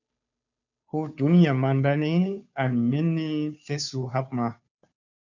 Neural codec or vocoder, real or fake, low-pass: codec, 16 kHz, 2 kbps, FunCodec, trained on Chinese and English, 25 frames a second; fake; 7.2 kHz